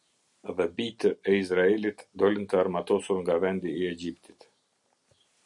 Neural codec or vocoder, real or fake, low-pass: none; real; 10.8 kHz